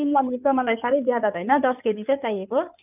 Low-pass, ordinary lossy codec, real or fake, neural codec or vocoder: 3.6 kHz; none; fake; codec, 16 kHz in and 24 kHz out, 2.2 kbps, FireRedTTS-2 codec